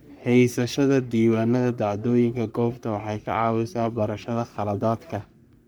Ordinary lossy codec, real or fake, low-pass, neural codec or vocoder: none; fake; none; codec, 44.1 kHz, 3.4 kbps, Pupu-Codec